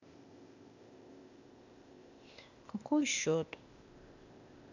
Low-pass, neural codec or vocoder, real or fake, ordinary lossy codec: 7.2 kHz; codec, 16 kHz, 0.8 kbps, ZipCodec; fake; none